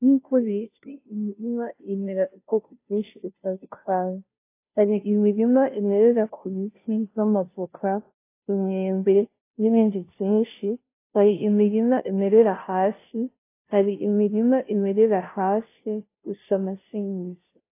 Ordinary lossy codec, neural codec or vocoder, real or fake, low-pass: AAC, 24 kbps; codec, 16 kHz, 0.5 kbps, FunCodec, trained on Chinese and English, 25 frames a second; fake; 3.6 kHz